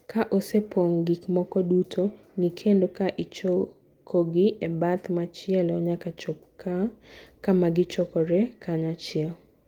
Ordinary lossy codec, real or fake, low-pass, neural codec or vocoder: Opus, 24 kbps; real; 19.8 kHz; none